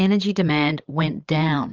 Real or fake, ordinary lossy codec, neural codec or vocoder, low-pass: fake; Opus, 32 kbps; codec, 16 kHz, 8 kbps, FreqCodec, larger model; 7.2 kHz